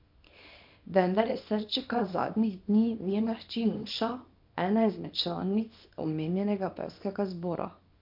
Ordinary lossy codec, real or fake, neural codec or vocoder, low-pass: MP3, 48 kbps; fake; codec, 24 kHz, 0.9 kbps, WavTokenizer, small release; 5.4 kHz